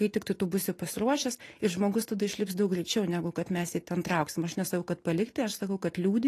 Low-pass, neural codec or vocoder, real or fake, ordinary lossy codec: 14.4 kHz; none; real; AAC, 48 kbps